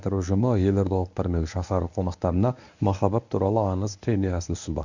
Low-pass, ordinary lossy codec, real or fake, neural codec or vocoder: 7.2 kHz; none; fake; codec, 24 kHz, 0.9 kbps, WavTokenizer, medium speech release version 1